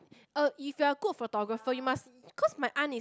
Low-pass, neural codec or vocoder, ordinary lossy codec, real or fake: none; none; none; real